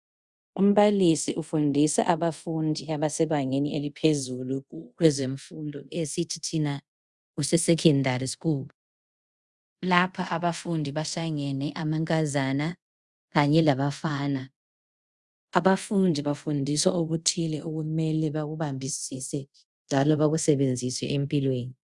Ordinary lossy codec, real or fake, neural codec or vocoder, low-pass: Opus, 64 kbps; fake; codec, 24 kHz, 0.5 kbps, DualCodec; 10.8 kHz